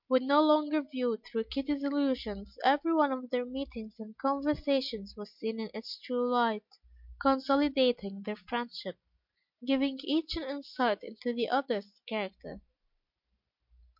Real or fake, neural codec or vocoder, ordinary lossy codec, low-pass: real; none; MP3, 48 kbps; 5.4 kHz